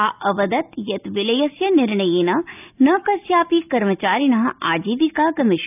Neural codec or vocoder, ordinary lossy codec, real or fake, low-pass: none; none; real; 3.6 kHz